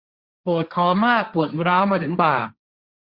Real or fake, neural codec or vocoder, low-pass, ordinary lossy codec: fake; codec, 16 kHz, 1.1 kbps, Voila-Tokenizer; 5.4 kHz; Opus, 64 kbps